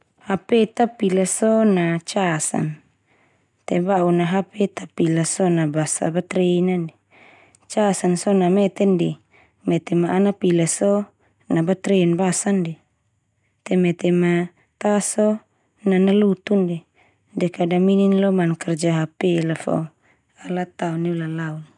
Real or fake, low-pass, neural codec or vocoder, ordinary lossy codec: real; 10.8 kHz; none; none